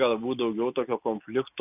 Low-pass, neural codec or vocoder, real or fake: 3.6 kHz; none; real